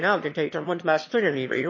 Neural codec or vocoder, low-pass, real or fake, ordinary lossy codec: autoencoder, 22.05 kHz, a latent of 192 numbers a frame, VITS, trained on one speaker; 7.2 kHz; fake; MP3, 32 kbps